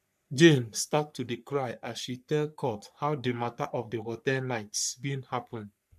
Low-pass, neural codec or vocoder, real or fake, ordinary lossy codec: 14.4 kHz; codec, 44.1 kHz, 3.4 kbps, Pupu-Codec; fake; MP3, 96 kbps